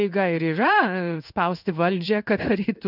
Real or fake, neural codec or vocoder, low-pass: fake; codec, 16 kHz in and 24 kHz out, 1 kbps, XY-Tokenizer; 5.4 kHz